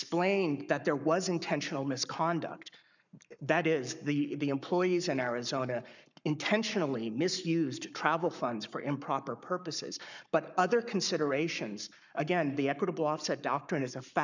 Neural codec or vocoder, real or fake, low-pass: codec, 44.1 kHz, 7.8 kbps, Pupu-Codec; fake; 7.2 kHz